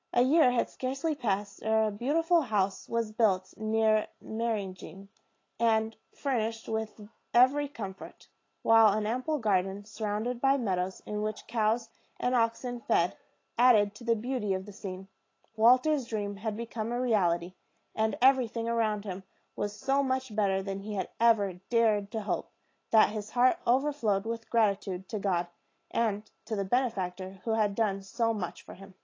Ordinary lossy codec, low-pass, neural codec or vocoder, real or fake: AAC, 32 kbps; 7.2 kHz; none; real